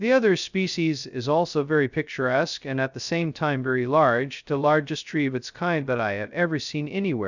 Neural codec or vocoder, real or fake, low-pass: codec, 16 kHz, 0.2 kbps, FocalCodec; fake; 7.2 kHz